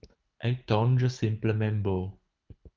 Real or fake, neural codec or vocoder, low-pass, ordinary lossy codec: fake; codec, 24 kHz, 6 kbps, HILCodec; 7.2 kHz; Opus, 24 kbps